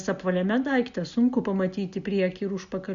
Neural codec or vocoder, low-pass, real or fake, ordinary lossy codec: none; 7.2 kHz; real; Opus, 64 kbps